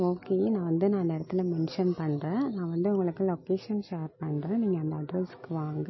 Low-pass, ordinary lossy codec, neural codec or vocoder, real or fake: 7.2 kHz; MP3, 24 kbps; none; real